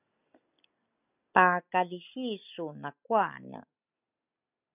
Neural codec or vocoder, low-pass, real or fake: none; 3.6 kHz; real